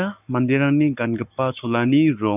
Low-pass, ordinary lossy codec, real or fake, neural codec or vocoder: 3.6 kHz; none; fake; codec, 44.1 kHz, 7.8 kbps, Pupu-Codec